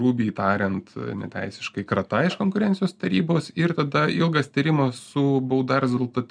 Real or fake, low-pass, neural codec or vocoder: real; 9.9 kHz; none